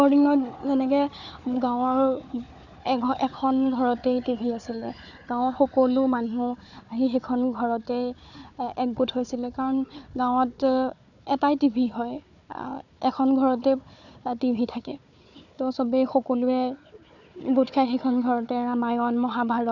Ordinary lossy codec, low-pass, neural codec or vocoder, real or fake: none; 7.2 kHz; codec, 16 kHz, 4 kbps, FunCodec, trained on Chinese and English, 50 frames a second; fake